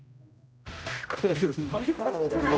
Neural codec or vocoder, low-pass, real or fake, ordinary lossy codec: codec, 16 kHz, 0.5 kbps, X-Codec, HuBERT features, trained on general audio; none; fake; none